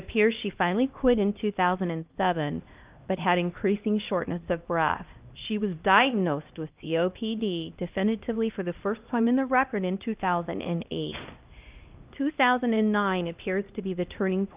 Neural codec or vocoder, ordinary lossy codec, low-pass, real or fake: codec, 16 kHz, 1 kbps, X-Codec, HuBERT features, trained on LibriSpeech; Opus, 24 kbps; 3.6 kHz; fake